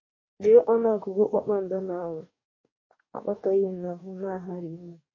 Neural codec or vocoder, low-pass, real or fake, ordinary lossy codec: codec, 44.1 kHz, 2.6 kbps, DAC; 7.2 kHz; fake; MP3, 32 kbps